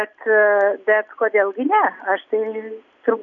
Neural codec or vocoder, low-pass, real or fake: none; 7.2 kHz; real